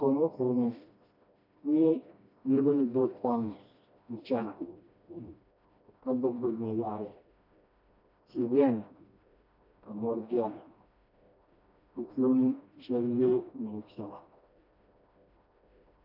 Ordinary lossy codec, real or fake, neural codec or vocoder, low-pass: AAC, 48 kbps; fake; codec, 16 kHz, 1 kbps, FreqCodec, smaller model; 5.4 kHz